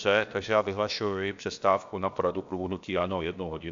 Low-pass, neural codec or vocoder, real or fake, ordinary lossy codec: 7.2 kHz; codec, 16 kHz, about 1 kbps, DyCAST, with the encoder's durations; fake; AAC, 64 kbps